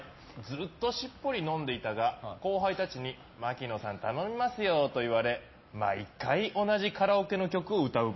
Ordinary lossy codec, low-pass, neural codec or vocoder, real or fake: MP3, 24 kbps; 7.2 kHz; none; real